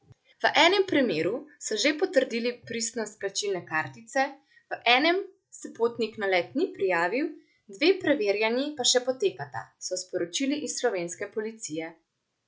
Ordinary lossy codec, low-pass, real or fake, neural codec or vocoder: none; none; real; none